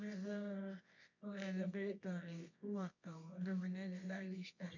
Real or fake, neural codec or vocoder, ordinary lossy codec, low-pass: fake; codec, 24 kHz, 0.9 kbps, WavTokenizer, medium music audio release; none; 7.2 kHz